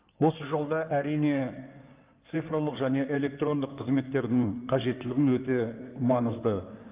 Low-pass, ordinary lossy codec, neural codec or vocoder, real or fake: 3.6 kHz; Opus, 24 kbps; codec, 16 kHz in and 24 kHz out, 2.2 kbps, FireRedTTS-2 codec; fake